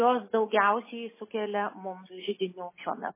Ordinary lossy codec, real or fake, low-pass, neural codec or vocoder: MP3, 16 kbps; real; 3.6 kHz; none